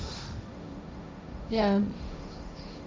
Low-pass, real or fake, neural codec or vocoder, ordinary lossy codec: none; fake; codec, 16 kHz, 1.1 kbps, Voila-Tokenizer; none